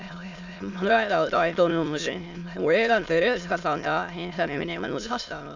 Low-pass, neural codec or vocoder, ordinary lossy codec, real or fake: 7.2 kHz; autoencoder, 22.05 kHz, a latent of 192 numbers a frame, VITS, trained on many speakers; none; fake